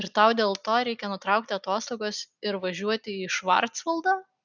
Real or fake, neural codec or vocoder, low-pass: real; none; 7.2 kHz